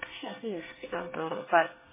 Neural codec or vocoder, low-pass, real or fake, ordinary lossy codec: codec, 24 kHz, 1 kbps, SNAC; 3.6 kHz; fake; MP3, 16 kbps